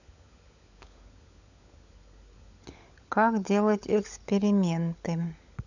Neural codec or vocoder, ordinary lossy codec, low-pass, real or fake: codec, 16 kHz, 16 kbps, FunCodec, trained on LibriTTS, 50 frames a second; none; 7.2 kHz; fake